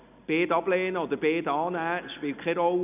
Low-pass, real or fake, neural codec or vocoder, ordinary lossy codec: 3.6 kHz; real; none; none